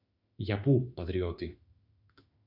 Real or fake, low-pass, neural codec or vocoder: fake; 5.4 kHz; autoencoder, 48 kHz, 32 numbers a frame, DAC-VAE, trained on Japanese speech